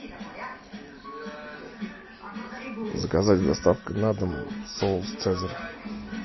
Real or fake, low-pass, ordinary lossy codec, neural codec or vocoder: real; 7.2 kHz; MP3, 24 kbps; none